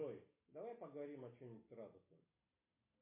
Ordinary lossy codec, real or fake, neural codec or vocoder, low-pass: MP3, 24 kbps; real; none; 3.6 kHz